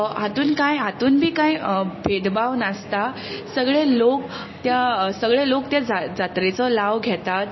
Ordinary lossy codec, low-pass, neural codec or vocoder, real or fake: MP3, 24 kbps; 7.2 kHz; none; real